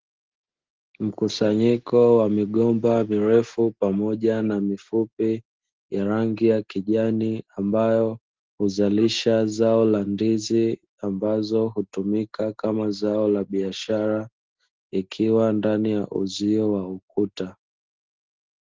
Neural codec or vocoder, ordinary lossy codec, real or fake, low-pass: none; Opus, 16 kbps; real; 7.2 kHz